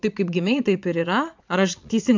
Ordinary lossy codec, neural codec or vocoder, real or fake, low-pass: MP3, 64 kbps; vocoder, 22.05 kHz, 80 mel bands, Vocos; fake; 7.2 kHz